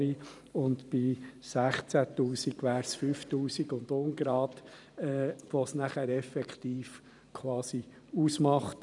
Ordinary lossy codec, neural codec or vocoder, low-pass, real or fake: none; vocoder, 44.1 kHz, 128 mel bands every 512 samples, BigVGAN v2; 10.8 kHz; fake